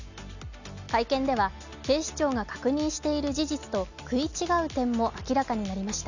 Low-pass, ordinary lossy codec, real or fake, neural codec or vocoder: 7.2 kHz; none; real; none